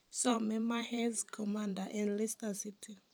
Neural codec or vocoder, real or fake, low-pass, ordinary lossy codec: vocoder, 44.1 kHz, 128 mel bands every 512 samples, BigVGAN v2; fake; none; none